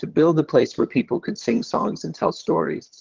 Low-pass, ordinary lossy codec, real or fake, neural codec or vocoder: 7.2 kHz; Opus, 16 kbps; fake; vocoder, 22.05 kHz, 80 mel bands, HiFi-GAN